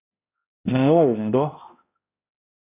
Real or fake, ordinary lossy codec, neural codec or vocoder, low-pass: fake; AAC, 24 kbps; codec, 16 kHz, 0.5 kbps, X-Codec, HuBERT features, trained on balanced general audio; 3.6 kHz